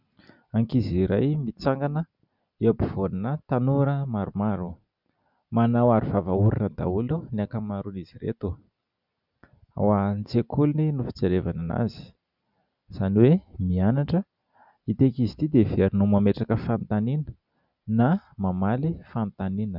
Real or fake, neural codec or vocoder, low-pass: real; none; 5.4 kHz